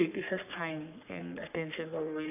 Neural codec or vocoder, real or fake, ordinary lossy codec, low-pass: codec, 44.1 kHz, 3.4 kbps, Pupu-Codec; fake; none; 3.6 kHz